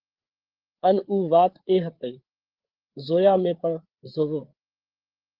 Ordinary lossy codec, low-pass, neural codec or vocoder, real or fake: Opus, 16 kbps; 5.4 kHz; codec, 16 kHz, 8 kbps, FreqCodec, larger model; fake